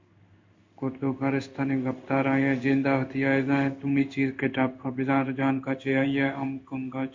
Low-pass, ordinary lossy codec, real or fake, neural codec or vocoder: 7.2 kHz; MP3, 48 kbps; fake; codec, 16 kHz in and 24 kHz out, 1 kbps, XY-Tokenizer